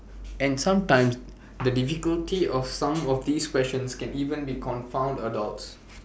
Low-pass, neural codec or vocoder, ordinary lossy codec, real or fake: none; none; none; real